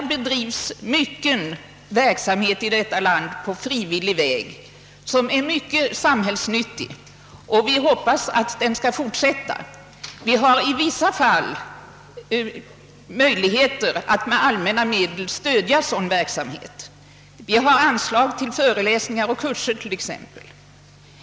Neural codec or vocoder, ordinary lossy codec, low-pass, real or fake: none; none; none; real